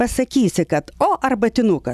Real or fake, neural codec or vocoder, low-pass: real; none; 14.4 kHz